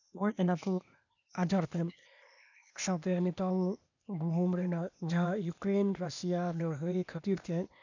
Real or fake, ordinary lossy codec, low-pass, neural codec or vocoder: fake; none; 7.2 kHz; codec, 16 kHz, 0.8 kbps, ZipCodec